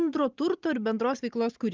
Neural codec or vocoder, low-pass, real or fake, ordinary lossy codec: none; 7.2 kHz; real; Opus, 24 kbps